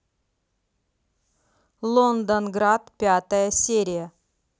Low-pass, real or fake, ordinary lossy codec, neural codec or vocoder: none; real; none; none